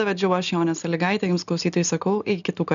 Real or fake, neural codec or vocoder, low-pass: real; none; 7.2 kHz